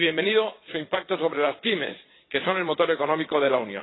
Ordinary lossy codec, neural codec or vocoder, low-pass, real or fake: AAC, 16 kbps; vocoder, 22.05 kHz, 80 mel bands, Vocos; 7.2 kHz; fake